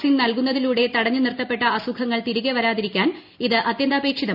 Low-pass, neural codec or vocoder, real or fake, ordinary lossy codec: 5.4 kHz; none; real; none